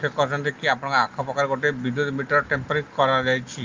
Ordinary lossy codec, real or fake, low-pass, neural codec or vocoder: Opus, 32 kbps; real; 7.2 kHz; none